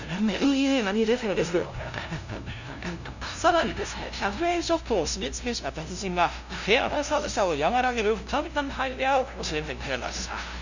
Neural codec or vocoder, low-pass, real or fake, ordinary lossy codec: codec, 16 kHz, 0.5 kbps, FunCodec, trained on LibriTTS, 25 frames a second; 7.2 kHz; fake; none